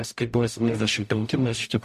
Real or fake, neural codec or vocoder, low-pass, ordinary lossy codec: fake; codec, 44.1 kHz, 0.9 kbps, DAC; 14.4 kHz; MP3, 64 kbps